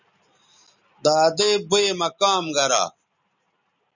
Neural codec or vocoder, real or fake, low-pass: none; real; 7.2 kHz